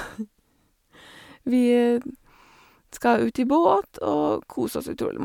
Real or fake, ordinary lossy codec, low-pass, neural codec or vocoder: real; MP3, 96 kbps; 19.8 kHz; none